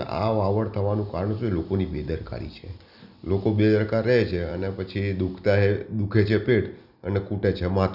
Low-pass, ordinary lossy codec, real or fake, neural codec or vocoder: 5.4 kHz; none; real; none